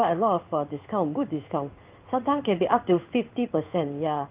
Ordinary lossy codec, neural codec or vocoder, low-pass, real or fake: Opus, 32 kbps; codec, 16 kHz in and 24 kHz out, 1 kbps, XY-Tokenizer; 3.6 kHz; fake